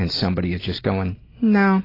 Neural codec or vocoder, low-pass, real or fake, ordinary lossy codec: none; 5.4 kHz; real; AAC, 24 kbps